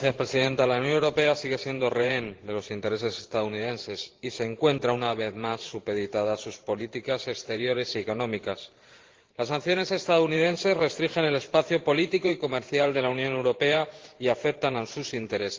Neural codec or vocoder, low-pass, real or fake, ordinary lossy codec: vocoder, 44.1 kHz, 128 mel bands every 512 samples, BigVGAN v2; 7.2 kHz; fake; Opus, 16 kbps